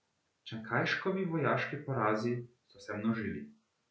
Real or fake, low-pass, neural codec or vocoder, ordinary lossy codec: real; none; none; none